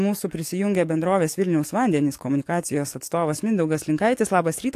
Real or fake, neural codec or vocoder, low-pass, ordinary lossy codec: fake; codec, 44.1 kHz, 7.8 kbps, DAC; 14.4 kHz; AAC, 64 kbps